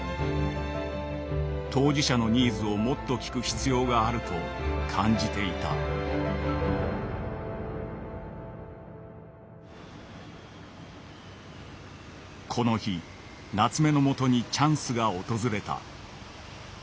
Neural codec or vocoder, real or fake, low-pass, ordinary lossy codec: none; real; none; none